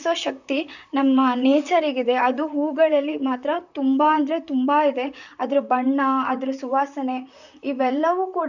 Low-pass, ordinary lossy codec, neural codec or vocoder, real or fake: 7.2 kHz; none; vocoder, 44.1 kHz, 128 mel bands, Pupu-Vocoder; fake